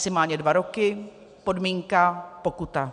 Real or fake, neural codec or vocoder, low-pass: real; none; 9.9 kHz